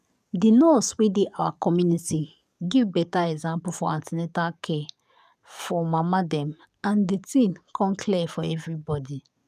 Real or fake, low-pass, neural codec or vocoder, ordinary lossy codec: fake; 14.4 kHz; codec, 44.1 kHz, 7.8 kbps, DAC; none